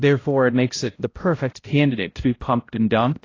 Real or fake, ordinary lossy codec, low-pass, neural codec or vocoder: fake; AAC, 32 kbps; 7.2 kHz; codec, 16 kHz, 0.5 kbps, X-Codec, HuBERT features, trained on balanced general audio